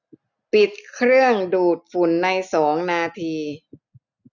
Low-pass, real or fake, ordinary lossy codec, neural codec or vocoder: 7.2 kHz; real; none; none